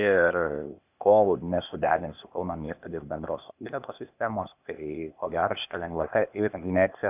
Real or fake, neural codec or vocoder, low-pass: fake; codec, 16 kHz, 0.8 kbps, ZipCodec; 3.6 kHz